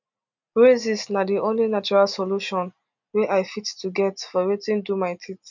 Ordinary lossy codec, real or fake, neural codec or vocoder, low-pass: none; real; none; 7.2 kHz